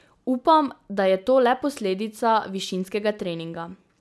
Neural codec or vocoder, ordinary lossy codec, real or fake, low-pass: none; none; real; none